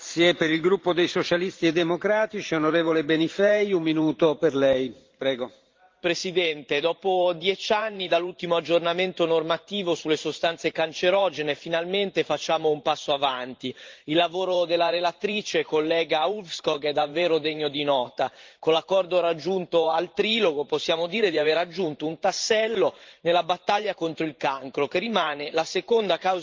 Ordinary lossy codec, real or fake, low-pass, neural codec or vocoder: Opus, 32 kbps; fake; 7.2 kHz; vocoder, 44.1 kHz, 128 mel bands every 512 samples, BigVGAN v2